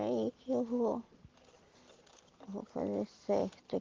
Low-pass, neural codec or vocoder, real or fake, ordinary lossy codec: 7.2 kHz; none; real; Opus, 16 kbps